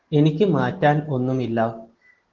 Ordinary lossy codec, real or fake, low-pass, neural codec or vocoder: Opus, 16 kbps; real; 7.2 kHz; none